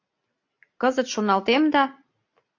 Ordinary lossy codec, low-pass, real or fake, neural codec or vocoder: AAC, 48 kbps; 7.2 kHz; real; none